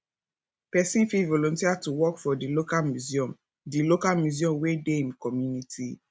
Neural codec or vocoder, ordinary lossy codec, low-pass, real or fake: none; none; none; real